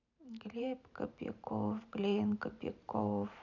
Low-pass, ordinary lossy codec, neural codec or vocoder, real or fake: 7.2 kHz; none; vocoder, 44.1 kHz, 128 mel bands every 512 samples, BigVGAN v2; fake